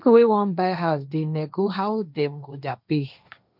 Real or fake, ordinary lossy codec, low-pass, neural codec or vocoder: fake; none; 5.4 kHz; codec, 16 kHz, 1.1 kbps, Voila-Tokenizer